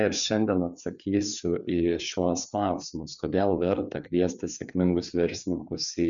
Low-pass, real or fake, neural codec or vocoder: 7.2 kHz; fake; codec, 16 kHz, 4 kbps, FreqCodec, larger model